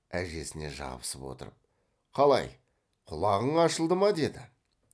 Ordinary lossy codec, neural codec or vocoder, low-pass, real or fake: none; none; none; real